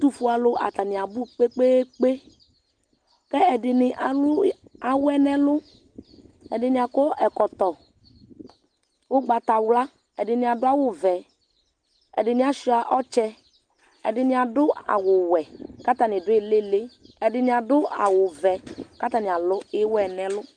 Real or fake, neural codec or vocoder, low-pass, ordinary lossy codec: real; none; 9.9 kHz; Opus, 24 kbps